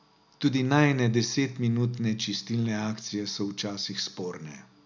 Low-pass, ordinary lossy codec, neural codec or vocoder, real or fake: 7.2 kHz; none; none; real